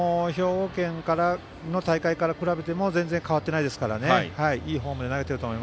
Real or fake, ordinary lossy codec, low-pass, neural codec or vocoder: real; none; none; none